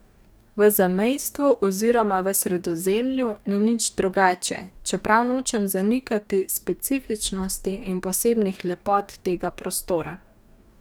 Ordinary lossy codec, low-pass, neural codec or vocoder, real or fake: none; none; codec, 44.1 kHz, 2.6 kbps, DAC; fake